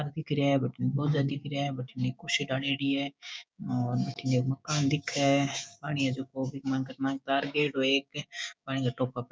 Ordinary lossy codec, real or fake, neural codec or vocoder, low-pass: none; fake; codec, 16 kHz, 6 kbps, DAC; none